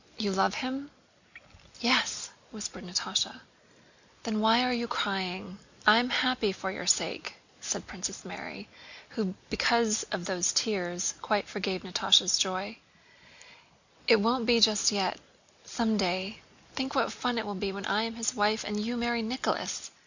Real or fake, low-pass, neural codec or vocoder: real; 7.2 kHz; none